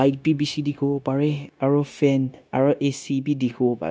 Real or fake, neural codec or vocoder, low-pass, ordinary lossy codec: fake; codec, 16 kHz, 0.9 kbps, LongCat-Audio-Codec; none; none